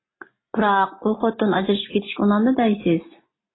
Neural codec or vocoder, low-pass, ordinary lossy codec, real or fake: none; 7.2 kHz; AAC, 16 kbps; real